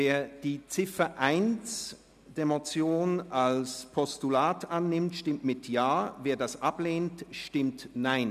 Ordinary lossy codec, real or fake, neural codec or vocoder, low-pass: none; real; none; 14.4 kHz